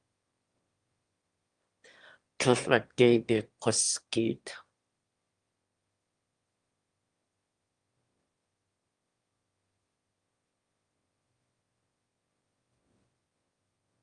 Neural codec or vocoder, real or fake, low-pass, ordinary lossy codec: autoencoder, 22.05 kHz, a latent of 192 numbers a frame, VITS, trained on one speaker; fake; 9.9 kHz; Opus, 32 kbps